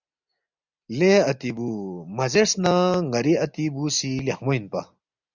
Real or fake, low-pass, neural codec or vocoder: real; 7.2 kHz; none